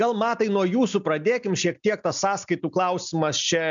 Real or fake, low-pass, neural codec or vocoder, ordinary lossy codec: real; 7.2 kHz; none; MP3, 96 kbps